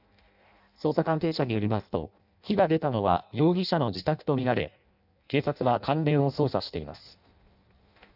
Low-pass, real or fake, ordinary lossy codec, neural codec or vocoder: 5.4 kHz; fake; none; codec, 16 kHz in and 24 kHz out, 0.6 kbps, FireRedTTS-2 codec